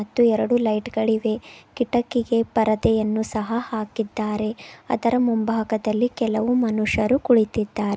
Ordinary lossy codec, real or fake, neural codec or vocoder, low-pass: none; real; none; none